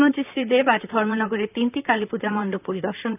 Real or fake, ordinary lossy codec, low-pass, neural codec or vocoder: fake; none; 3.6 kHz; vocoder, 44.1 kHz, 128 mel bands, Pupu-Vocoder